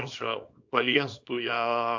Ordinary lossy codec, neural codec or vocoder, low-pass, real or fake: AAC, 48 kbps; codec, 24 kHz, 0.9 kbps, WavTokenizer, small release; 7.2 kHz; fake